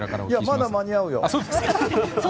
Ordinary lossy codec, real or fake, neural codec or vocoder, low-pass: none; real; none; none